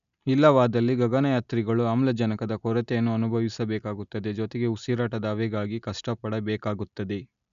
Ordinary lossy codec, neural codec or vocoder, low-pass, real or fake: none; none; 7.2 kHz; real